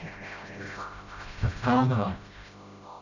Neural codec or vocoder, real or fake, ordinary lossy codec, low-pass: codec, 16 kHz, 0.5 kbps, FreqCodec, smaller model; fake; none; 7.2 kHz